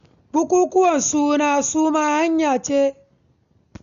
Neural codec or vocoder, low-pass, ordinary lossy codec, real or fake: none; 7.2 kHz; none; real